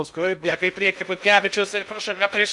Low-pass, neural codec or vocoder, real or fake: 10.8 kHz; codec, 16 kHz in and 24 kHz out, 0.6 kbps, FocalCodec, streaming, 2048 codes; fake